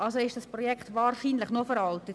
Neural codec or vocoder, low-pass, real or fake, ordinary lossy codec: none; none; real; none